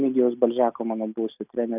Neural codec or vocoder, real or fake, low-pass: none; real; 3.6 kHz